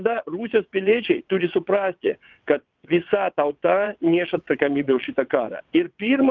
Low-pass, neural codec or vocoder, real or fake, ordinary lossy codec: 7.2 kHz; vocoder, 22.05 kHz, 80 mel bands, WaveNeXt; fake; Opus, 24 kbps